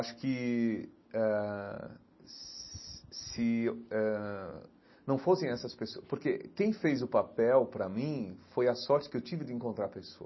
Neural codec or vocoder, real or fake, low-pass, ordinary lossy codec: none; real; 7.2 kHz; MP3, 24 kbps